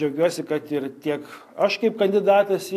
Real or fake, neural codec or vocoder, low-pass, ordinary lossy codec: real; none; 14.4 kHz; AAC, 64 kbps